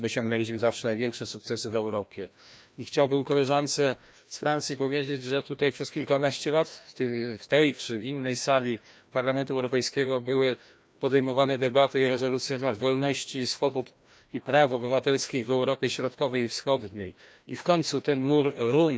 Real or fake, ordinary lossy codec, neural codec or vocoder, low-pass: fake; none; codec, 16 kHz, 1 kbps, FreqCodec, larger model; none